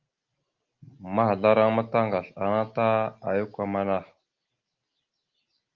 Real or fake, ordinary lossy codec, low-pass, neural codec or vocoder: real; Opus, 32 kbps; 7.2 kHz; none